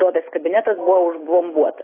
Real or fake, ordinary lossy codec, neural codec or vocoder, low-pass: real; AAC, 16 kbps; none; 3.6 kHz